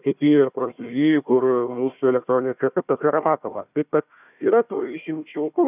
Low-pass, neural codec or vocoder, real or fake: 3.6 kHz; codec, 16 kHz, 1 kbps, FunCodec, trained on Chinese and English, 50 frames a second; fake